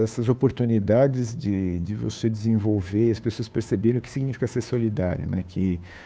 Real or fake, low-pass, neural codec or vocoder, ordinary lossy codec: fake; none; codec, 16 kHz, 2 kbps, FunCodec, trained on Chinese and English, 25 frames a second; none